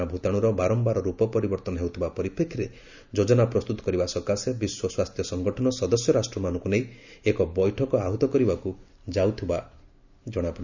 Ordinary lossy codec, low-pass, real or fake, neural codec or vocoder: none; 7.2 kHz; real; none